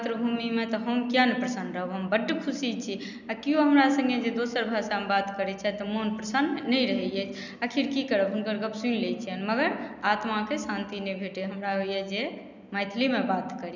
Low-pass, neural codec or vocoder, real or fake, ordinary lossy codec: 7.2 kHz; none; real; none